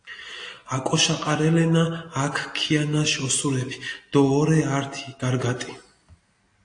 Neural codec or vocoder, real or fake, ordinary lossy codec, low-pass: none; real; AAC, 48 kbps; 9.9 kHz